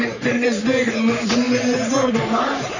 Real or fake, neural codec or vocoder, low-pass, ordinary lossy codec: fake; codec, 44.1 kHz, 3.4 kbps, Pupu-Codec; 7.2 kHz; none